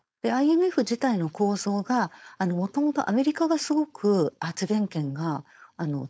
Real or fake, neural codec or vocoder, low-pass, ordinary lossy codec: fake; codec, 16 kHz, 4.8 kbps, FACodec; none; none